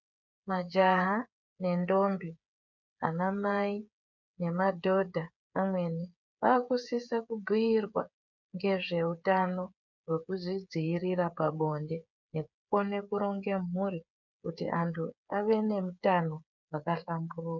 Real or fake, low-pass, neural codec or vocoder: fake; 7.2 kHz; codec, 16 kHz, 8 kbps, FreqCodec, smaller model